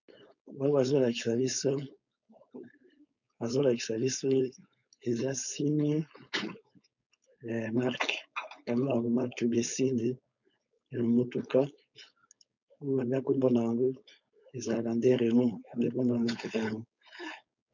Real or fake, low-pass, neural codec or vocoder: fake; 7.2 kHz; codec, 16 kHz, 4.8 kbps, FACodec